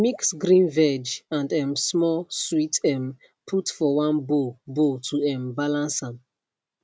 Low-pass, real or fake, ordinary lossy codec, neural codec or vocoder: none; real; none; none